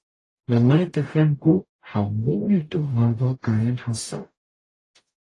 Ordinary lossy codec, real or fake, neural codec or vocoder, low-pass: AAC, 32 kbps; fake; codec, 44.1 kHz, 0.9 kbps, DAC; 10.8 kHz